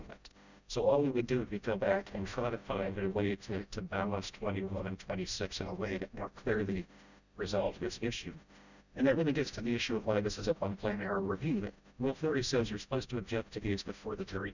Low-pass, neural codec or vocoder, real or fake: 7.2 kHz; codec, 16 kHz, 0.5 kbps, FreqCodec, smaller model; fake